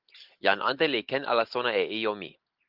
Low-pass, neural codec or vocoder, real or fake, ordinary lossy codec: 5.4 kHz; none; real; Opus, 32 kbps